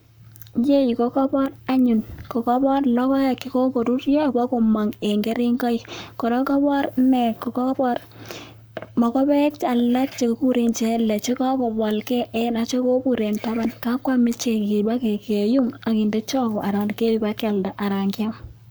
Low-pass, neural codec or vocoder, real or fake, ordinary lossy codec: none; codec, 44.1 kHz, 7.8 kbps, Pupu-Codec; fake; none